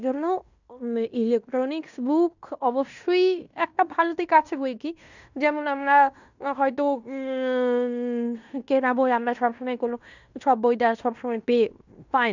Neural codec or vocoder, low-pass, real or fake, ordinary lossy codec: codec, 16 kHz in and 24 kHz out, 0.9 kbps, LongCat-Audio-Codec, fine tuned four codebook decoder; 7.2 kHz; fake; none